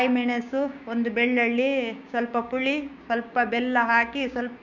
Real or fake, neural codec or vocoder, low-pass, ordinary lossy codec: fake; codec, 16 kHz, 6 kbps, DAC; 7.2 kHz; none